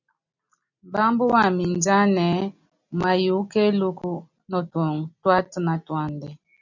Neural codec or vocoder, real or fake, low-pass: none; real; 7.2 kHz